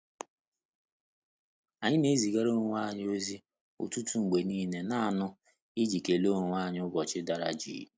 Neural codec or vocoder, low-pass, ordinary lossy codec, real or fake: none; none; none; real